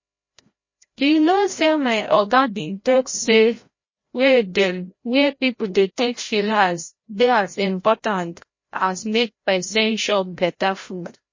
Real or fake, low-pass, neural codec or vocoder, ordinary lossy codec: fake; 7.2 kHz; codec, 16 kHz, 0.5 kbps, FreqCodec, larger model; MP3, 32 kbps